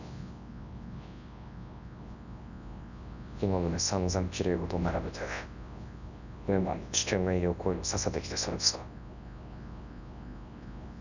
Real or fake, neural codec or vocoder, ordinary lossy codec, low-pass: fake; codec, 24 kHz, 0.9 kbps, WavTokenizer, large speech release; none; 7.2 kHz